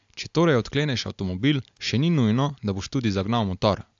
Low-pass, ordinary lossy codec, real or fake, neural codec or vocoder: 7.2 kHz; AAC, 64 kbps; real; none